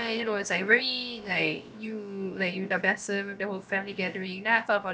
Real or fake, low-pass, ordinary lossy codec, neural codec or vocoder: fake; none; none; codec, 16 kHz, about 1 kbps, DyCAST, with the encoder's durations